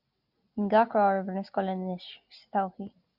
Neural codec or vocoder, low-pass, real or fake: none; 5.4 kHz; real